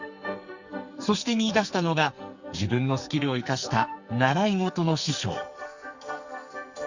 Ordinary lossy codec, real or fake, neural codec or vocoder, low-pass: Opus, 64 kbps; fake; codec, 44.1 kHz, 2.6 kbps, SNAC; 7.2 kHz